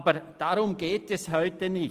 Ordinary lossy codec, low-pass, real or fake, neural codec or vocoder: Opus, 24 kbps; 14.4 kHz; real; none